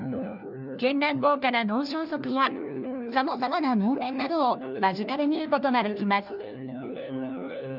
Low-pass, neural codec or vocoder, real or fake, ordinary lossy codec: 5.4 kHz; codec, 16 kHz, 1 kbps, FunCodec, trained on LibriTTS, 50 frames a second; fake; none